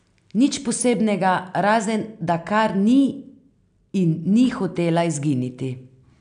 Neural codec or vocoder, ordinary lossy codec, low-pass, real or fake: none; none; 9.9 kHz; real